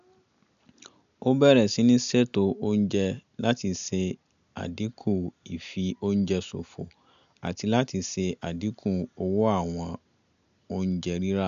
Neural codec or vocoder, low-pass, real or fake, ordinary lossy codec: none; 7.2 kHz; real; AAC, 96 kbps